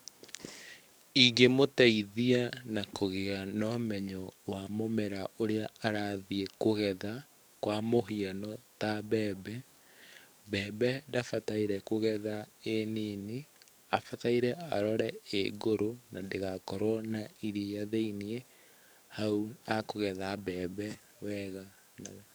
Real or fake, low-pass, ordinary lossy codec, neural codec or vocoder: fake; none; none; codec, 44.1 kHz, 7.8 kbps, DAC